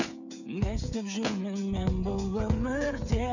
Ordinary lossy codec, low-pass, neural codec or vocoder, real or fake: none; 7.2 kHz; codec, 16 kHz, 8 kbps, FreqCodec, smaller model; fake